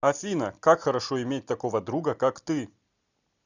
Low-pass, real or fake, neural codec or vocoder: 7.2 kHz; real; none